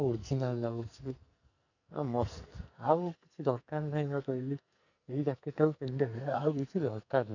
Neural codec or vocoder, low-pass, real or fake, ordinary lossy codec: codec, 44.1 kHz, 2.6 kbps, SNAC; 7.2 kHz; fake; none